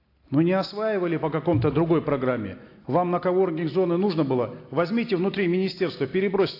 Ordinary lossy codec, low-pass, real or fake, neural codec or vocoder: AAC, 32 kbps; 5.4 kHz; real; none